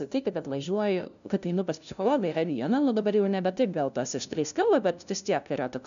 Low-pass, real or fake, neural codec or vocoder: 7.2 kHz; fake; codec, 16 kHz, 0.5 kbps, FunCodec, trained on LibriTTS, 25 frames a second